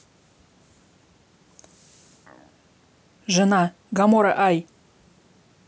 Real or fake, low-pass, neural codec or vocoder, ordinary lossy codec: real; none; none; none